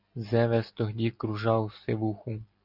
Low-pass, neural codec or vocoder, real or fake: 5.4 kHz; none; real